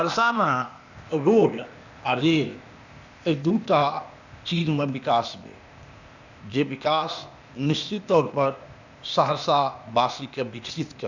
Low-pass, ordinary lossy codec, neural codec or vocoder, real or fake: 7.2 kHz; none; codec, 16 kHz, 0.8 kbps, ZipCodec; fake